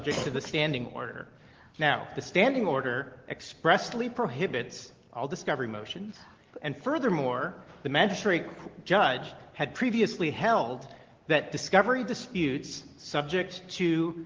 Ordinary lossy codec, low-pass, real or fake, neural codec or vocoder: Opus, 16 kbps; 7.2 kHz; real; none